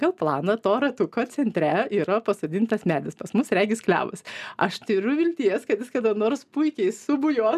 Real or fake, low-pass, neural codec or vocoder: real; 14.4 kHz; none